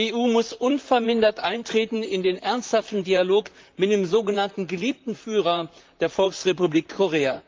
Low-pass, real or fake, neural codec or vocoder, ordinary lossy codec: 7.2 kHz; fake; vocoder, 44.1 kHz, 128 mel bands, Pupu-Vocoder; Opus, 32 kbps